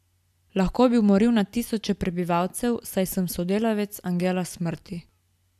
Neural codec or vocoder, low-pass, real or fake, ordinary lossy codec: none; 14.4 kHz; real; AAC, 96 kbps